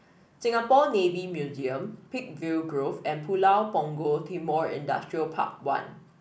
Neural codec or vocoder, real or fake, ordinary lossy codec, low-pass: none; real; none; none